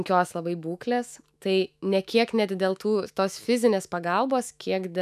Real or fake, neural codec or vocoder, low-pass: fake; autoencoder, 48 kHz, 128 numbers a frame, DAC-VAE, trained on Japanese speech; 14.4 kHz